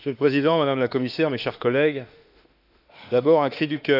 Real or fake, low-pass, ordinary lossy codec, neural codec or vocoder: fake; 5.4 kHz; none; autoencoder, 48 kHz, 32 numbers a frame, DAC-VAE, trained on Japanese speech